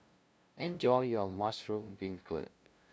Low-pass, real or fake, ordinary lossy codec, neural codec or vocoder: none; fake; none; codec, 16 kHz, 0.5 kbps, FunCodec, trained on LibriTTS, 25 frames a second